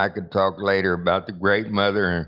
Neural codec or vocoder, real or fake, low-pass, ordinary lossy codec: none; real; 5.4 kHz; Opus, 24 kbps